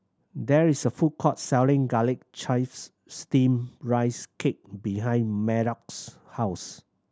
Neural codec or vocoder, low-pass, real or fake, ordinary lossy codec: none; none; real; none